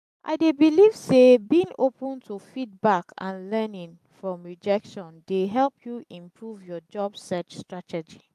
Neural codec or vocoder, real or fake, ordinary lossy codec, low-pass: none; real; AAC, 96 kbps; 14.4 kHz